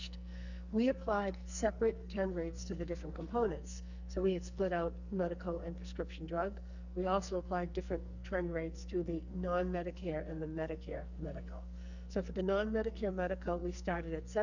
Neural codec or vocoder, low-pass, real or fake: codec, 44.1 kHz, 2.6 kbps, SNAC; 7.2 kHz; fake